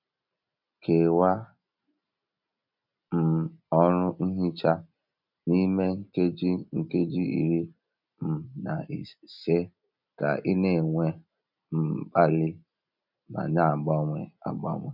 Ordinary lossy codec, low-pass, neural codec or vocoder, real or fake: none; 5.4 kHz; none; real